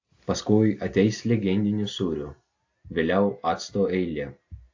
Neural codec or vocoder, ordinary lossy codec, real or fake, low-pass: none; AAC, 48 kbps; real; 7.2 kHz